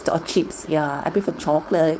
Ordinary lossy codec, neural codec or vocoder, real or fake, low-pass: none; codec, 16 kHz, 4.8 kbps, FACodec; fake; none